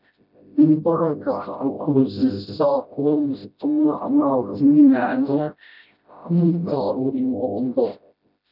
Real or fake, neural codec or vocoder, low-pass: fake; codec, 16 kHz, 0.5 kbps, FreqCodec, smaller model; 5.4 kHz